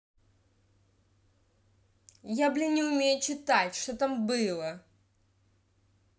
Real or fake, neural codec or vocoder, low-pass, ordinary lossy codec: real; none; none; none